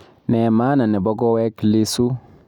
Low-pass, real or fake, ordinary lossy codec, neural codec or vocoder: 19.8 kHz; real; none; none